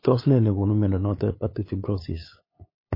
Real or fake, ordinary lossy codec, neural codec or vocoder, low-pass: fake; MP3, 24 kbps; codec, 16 kHz, 8 kbps, FunCodec, trained on LibriTTS, 25 frames a second; 5.4 kHz